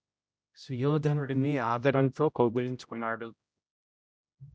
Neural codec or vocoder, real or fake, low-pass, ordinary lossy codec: codec, 16 kHz, 0.5 kbps, X-Codec, HuBERT features, trained on general audio; fake; none; none